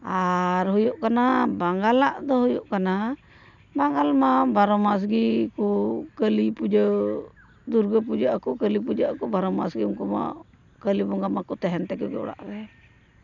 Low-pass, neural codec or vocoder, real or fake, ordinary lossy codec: 7.2 kHz; none; real; none